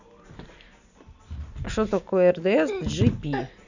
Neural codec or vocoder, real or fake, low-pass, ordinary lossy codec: none; real; 7.2 kHz; none